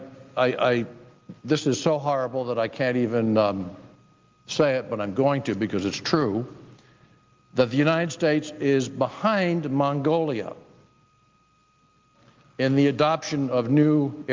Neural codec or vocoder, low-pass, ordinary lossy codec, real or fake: none; 7.2 kHz; Opus, 32 kbps; real